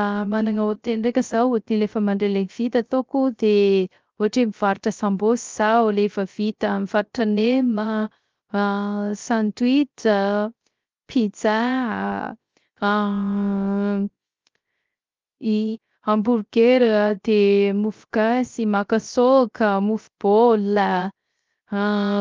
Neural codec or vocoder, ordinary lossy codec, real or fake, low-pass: codec, 16 kHz, 0.3 kbps, FocalCodec; Opus, 32 kbps; fake; 7.2 kHz